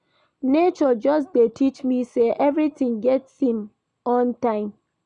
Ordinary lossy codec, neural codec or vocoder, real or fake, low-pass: none; none; real; 9.9 kHz